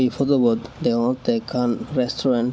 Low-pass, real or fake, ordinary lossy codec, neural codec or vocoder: none; real; none; none